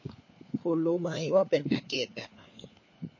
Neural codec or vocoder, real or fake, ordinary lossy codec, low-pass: codec, 16 kHz, 4 kbps, FunCodec, trained on LibriTTS, 50 frames a second; fake; MP3, 32 kbps; 7.2 kHz